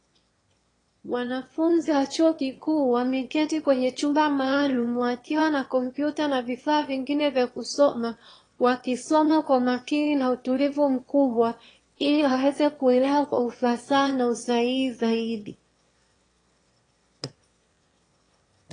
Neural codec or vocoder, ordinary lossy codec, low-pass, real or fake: autoencoder, 22.05 kHz, a latent of 192 numbers a frame, VITS, trained on one speaker; AAC, 32 kbps; 9.9 kHz; fake